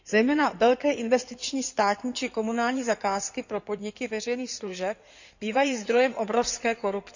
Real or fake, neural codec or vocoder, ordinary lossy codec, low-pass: fake; codec, 16 kHz in and 24 kHz out, 2.2 kbps, FireRedTTS-2 codec; none; 7.2 kHz